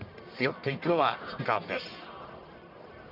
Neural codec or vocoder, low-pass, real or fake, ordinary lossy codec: codec, 44.1 kHz, 1.7 kbps, Pupu-Codec; 5.4 kHz; fake; MP3, 48 kbps